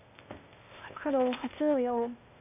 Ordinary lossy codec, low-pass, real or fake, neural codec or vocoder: none; 3.6 kHz; fake; codec, 16 kHz, 0.8 kbps, ZipCodec